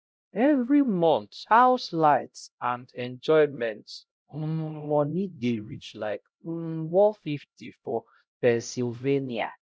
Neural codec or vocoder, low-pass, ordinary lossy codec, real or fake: codec, 16 kHz, 0.5 kbps, X-Codec, HuBERT features, trained on LibriSpeech; none; none; fake